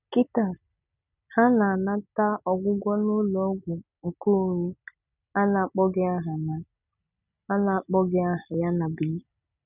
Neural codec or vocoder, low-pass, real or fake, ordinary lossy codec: none; 3.6 kHz; real; none